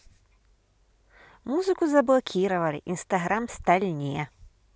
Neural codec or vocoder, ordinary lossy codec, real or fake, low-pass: none; none; real; none